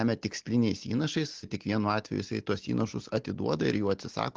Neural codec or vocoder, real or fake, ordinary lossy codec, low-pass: none; real; Opus, 16 kbps; 7.2 kHz